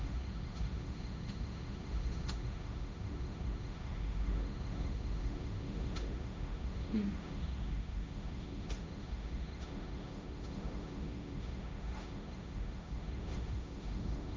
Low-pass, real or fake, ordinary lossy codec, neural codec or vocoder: none; fake; none; codec, 16 kHz, 1.1 kbps, Voila-Tokenizer